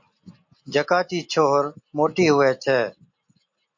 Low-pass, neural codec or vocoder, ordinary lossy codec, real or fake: 7.2 kHz; none; MP3, 48 kbps; real